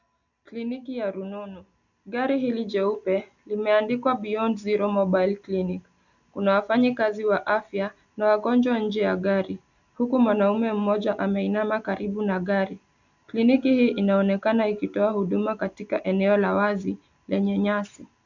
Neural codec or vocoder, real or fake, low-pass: none; real; 7.2 kHz